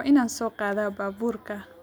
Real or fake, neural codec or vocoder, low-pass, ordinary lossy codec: real; none; none; none